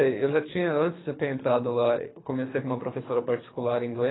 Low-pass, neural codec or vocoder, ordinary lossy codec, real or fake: 7.2 kHz; codec, 24 kHz, 3 kbps, HILCodec; AAC, 16 kbps; fake